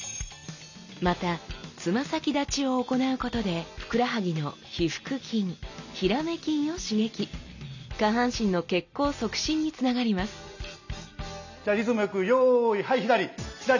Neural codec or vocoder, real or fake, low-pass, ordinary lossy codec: none; real; 7.2 kHz; none